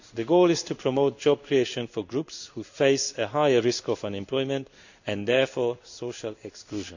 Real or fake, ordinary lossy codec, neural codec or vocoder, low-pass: fake; none; codec, 16 kHz in and 24 kHz out, 1 kbps, XY-Tokenizer; 7.2 kHz